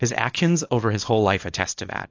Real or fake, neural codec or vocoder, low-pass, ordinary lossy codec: fake; codec, 24 kHz, 0.9 kbps, WavTokenizer, small release; 7.2 kHz; AAC, 48 kbps